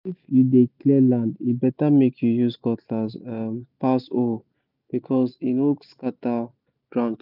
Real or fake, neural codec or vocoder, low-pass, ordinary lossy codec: real; none; 5.4 kHz; none